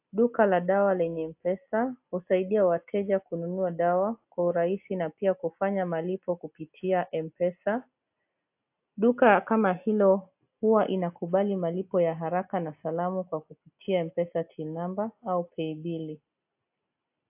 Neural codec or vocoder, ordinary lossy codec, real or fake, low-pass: none; AAC, 32 kbps; real; 3.6 kHz